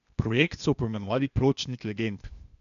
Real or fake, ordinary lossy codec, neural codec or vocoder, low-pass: fake; none; codec, 16 kHz, 0.8 kbps, ZipCodec; 7.2 kHz